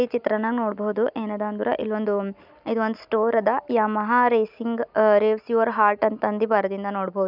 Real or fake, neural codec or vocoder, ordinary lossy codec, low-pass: real; none; none; 5.4 kHz